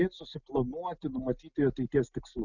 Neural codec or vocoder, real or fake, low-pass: none; real; 7.2 kHz